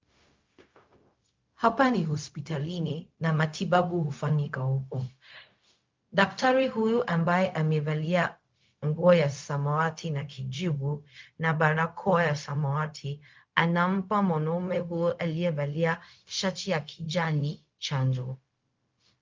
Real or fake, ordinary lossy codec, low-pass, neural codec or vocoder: fake; Opus, 24 kbps; 7.2 kHz; codec, 16 kHz, 0.4 kbps, LongCat-Audio-Codec